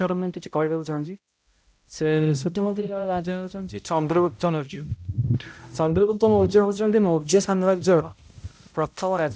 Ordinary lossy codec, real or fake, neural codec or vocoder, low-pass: none; fake; codec, 16 kHz, 0.5 kbps, X-Codec, HuBERT features, trained on balanced general audio; none